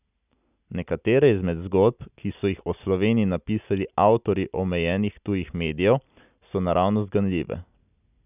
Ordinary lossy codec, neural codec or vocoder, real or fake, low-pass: none; none; real; 3.6 kHz